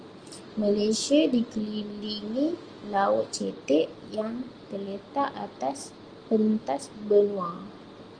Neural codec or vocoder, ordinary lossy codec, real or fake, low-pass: none; Opus, 24 kbps; real; 9.9 kHz